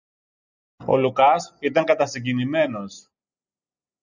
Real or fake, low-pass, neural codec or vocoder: real; 7.2 kHz; none